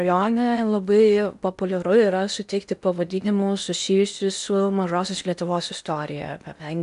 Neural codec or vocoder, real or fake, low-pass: codec, 16 kHz in and 24 kHz out, 0.8 kbps, FocalCodec, streaming, 65536 codes; fake; 10.8 kHz